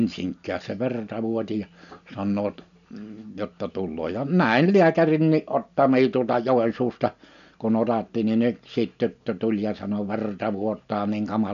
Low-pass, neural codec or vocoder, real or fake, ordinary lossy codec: 7.2 kHz; none; real; none